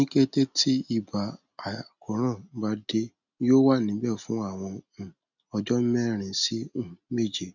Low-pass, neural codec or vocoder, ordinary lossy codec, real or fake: 7.2 kHz; none; none; real